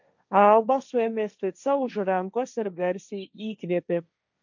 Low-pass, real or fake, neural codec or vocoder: 7.2 kHz; fake; codec, 16 kHz, 1.1 kbps, Voila-Tokenizer